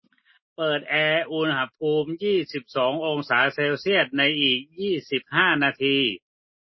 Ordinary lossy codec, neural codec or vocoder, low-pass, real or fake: MP3, 24 kbps; none; 7.2 kHz; real